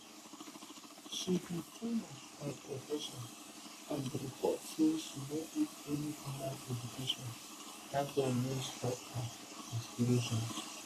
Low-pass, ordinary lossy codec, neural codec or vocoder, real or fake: 14.4 kHz; none; codec, 44.1 kHz, 3.4 kbps, Pupu-Codec; fake